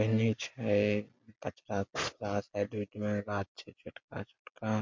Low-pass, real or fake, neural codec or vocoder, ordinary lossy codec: 7.2 kHz; real; none; MP3, 48 kbps